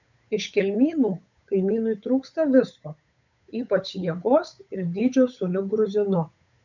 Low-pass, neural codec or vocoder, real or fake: 7.2 kHz; codec, 16 kHz, 8 kbps, FunCodec, trained on Chinese and English, 25 frames a second; fake